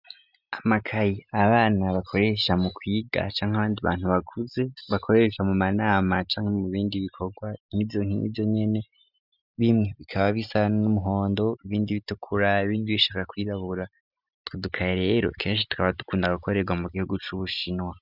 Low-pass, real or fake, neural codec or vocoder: 5.4 kHz; real; none